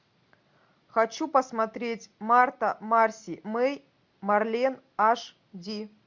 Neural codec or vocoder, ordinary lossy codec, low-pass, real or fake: none; MP3, 64 kbps; 7.2 kHz; real